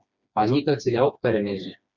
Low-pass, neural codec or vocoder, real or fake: 7.2 kHz; codec, 16 kHz, 2 kbps, FreqCodec, smaller model; fake